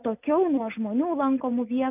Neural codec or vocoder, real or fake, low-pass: none; real; 3.6 kHz